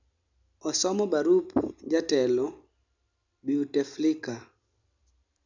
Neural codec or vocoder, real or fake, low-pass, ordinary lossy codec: none; real; 7.2 kHz; none